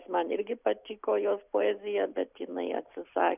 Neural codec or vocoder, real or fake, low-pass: none; real; 3.6 kHz